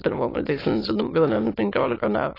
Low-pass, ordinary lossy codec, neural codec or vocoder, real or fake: 5.4 kHz; AAC, 24 kbps; autoencoder, 22.05 kHz, a latent of 192 numbers a frame, VITS, trained on many speakers; fake